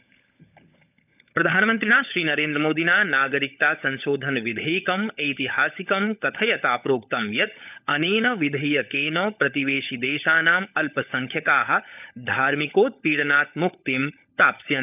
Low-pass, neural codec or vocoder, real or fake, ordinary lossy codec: 3.6 kHz; codec, 16 kHz, 16 kbps, FunCodec, trained on LibriTTS, 50 frames a second; fake; none